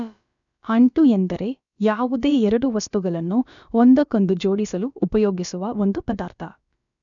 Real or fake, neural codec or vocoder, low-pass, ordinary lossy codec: fake; codec, 16 kHz, about 1 kbps, DyCAST, with the encoder's durations; 7.2 kHz; none